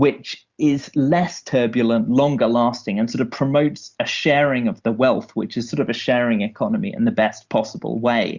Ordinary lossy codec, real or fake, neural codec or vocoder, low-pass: Opus, 64 kbps; real; none; 7.2 kHz